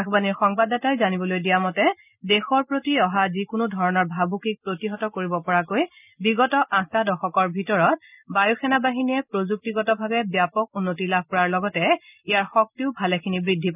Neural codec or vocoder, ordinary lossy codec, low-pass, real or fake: none; none; 3.6 kHz; real